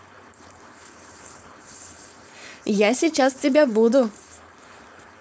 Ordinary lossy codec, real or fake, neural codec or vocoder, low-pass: none; fake; codec, 16 kHz, 4.8 kbps, FACodec; none